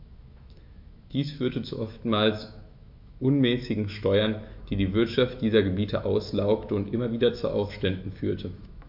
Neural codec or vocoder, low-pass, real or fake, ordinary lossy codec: autoencoder, 48 kHz, 128 numbers a frame, DAC-VAE, trained on Japanese speech; 5.4 kHz; fake; MP3, 48 kbps